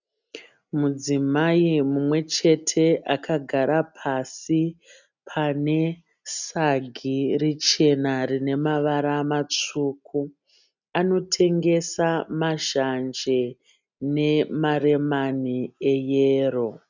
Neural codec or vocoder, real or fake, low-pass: none; real; 7.2 kHz